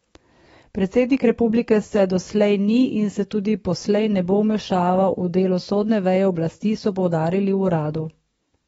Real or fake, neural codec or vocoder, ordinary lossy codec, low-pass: fake; codec, 44.1 kHz, 7.8 kbps, DAC; AAC, 24 kbps; 19.8 kHz